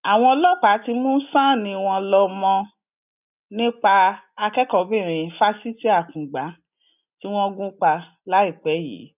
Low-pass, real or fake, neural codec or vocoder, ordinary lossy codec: 3.6 kHz; real; none; none